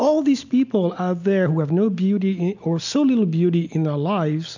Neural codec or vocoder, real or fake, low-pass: none; real; 7.2 kHz